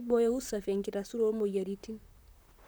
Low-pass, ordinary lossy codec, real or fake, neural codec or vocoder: none; none; fake; vocoder, 44.1 kHz, 128 mel bands, Pupu-Vocoder